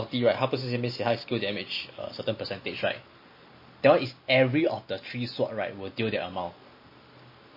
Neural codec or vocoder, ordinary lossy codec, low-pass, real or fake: none; MP3, 24 kbps; 5.4 kHz; real